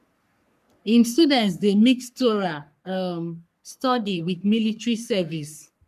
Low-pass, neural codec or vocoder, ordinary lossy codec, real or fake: 14.4 kHz; codec, 44.1 kHz, 3.4 kbps, Pupu-Codec; none; fake